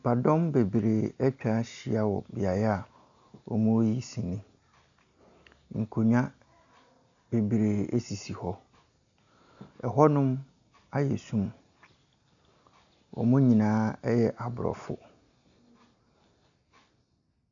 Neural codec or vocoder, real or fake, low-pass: none; real; 7.2 kHz